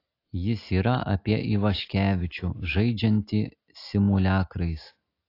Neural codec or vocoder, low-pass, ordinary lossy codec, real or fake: none; 5.4 kHz; AAC, 32 kbps; real